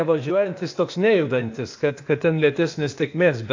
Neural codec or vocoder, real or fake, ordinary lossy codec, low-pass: codec, 16 kHz, 0.8 kbps, ZipCodec; fake; AAC, 48 kbps; 7.2 kHz